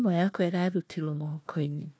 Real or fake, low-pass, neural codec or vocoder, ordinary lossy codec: fake; none; codec, 16 kHz, 1 kbps, FunCodec, trained on Chinese and English, 50 frames a second; none